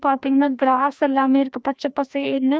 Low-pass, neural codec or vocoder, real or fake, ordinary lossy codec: none; codec, 16 kHz, 1 kbps, FreqCodec, larger model; fake; none